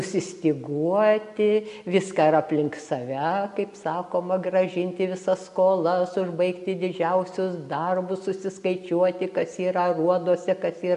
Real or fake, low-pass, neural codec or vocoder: real; 10.8 kHz; none